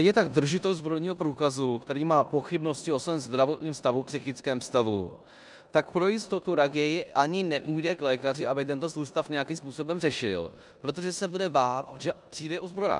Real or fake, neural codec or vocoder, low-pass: fake; codec, 16 kHz in and 24 kHz out, 0.9 kbps, LongCat-Audio-Codec, four codebook decoder; 10.8 kHz